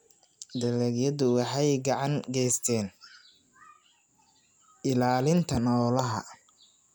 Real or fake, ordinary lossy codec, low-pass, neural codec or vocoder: real; none; none; none